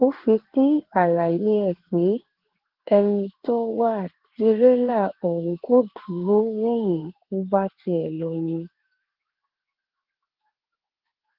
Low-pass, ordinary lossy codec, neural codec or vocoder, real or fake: 5.4 kHz; Opus, 16 kbps; codec, 16 kHz in and 24 kHz out, 1 kbps, XY-Tokenizer; fake